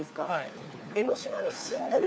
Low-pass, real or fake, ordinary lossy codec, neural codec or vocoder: none; fake; none; codec, 16 kHz, 4 kbps, FunCodec, trained on LibriTTS, 50 frames a second